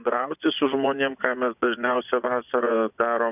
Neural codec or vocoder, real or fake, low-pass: vocoder, 24 kHz, 100 mel bands, Vocos; fake; 3.6 kHz